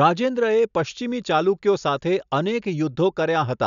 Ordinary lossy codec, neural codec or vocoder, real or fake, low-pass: none; none; real; 7.2 kHz